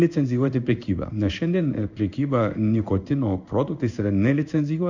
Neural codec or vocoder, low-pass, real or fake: codec, 16 kHz in and 24 kHz out, 1 kbps, XY-Tokenizer; 7.2 kHz; fake